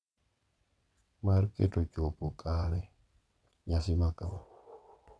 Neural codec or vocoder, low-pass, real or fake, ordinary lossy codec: vocoder, 22.05 kHz, 80 mel bands, WaveNeXt; none; fake; none